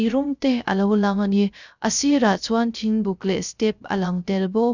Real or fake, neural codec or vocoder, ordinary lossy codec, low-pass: fake; codec, 16 kHz, 0.3 kbps, FocalCodec; none; 7.2 kHz